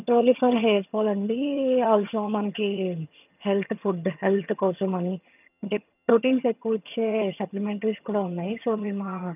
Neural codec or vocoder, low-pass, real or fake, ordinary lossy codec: vocoder, 22.05 kHz, 80 mel bands, HiFi-GAN; 3.6 kHz; fake; none